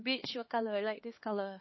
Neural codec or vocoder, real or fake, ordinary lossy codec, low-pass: codec, 16 kHz, 2 kbps, X-Codec, HuBERT features, trained on LibriSpeech; fake; MP3, 24 kbps; 7.2 kHz